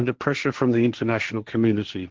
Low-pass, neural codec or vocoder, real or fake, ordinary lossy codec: 7.2 kHz; codec, 16 kHz, 1.1 kbps, Voila-Tokenizer; fake; Opus, 16 kbps